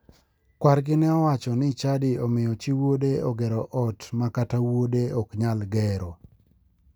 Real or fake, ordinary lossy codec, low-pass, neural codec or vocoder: real; none; none; none